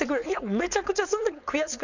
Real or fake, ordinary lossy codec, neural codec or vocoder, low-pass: fake; none; codec, 16 kHz, 4.8 kbps, FACodec; 7.2 kHz